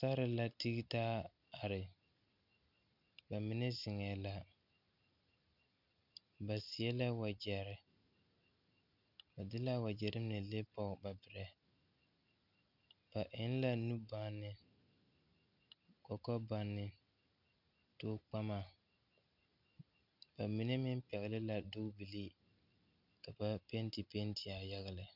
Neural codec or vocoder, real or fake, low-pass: none; real; 5.4 kHz